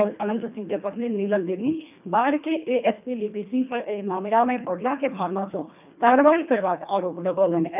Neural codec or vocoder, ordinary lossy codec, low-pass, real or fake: codec, 24 kHz, 1.5 kbps, HILCodec; none; 3.6 kHz; fake